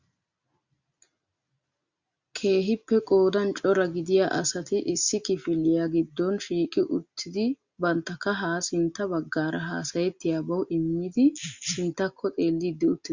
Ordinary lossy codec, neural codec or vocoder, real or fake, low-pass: Opus, 64 kbps; none; real; 7.2 kHz